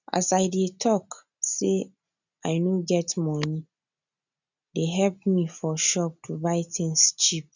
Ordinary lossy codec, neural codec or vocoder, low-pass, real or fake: none; none; 7.2 kHz; real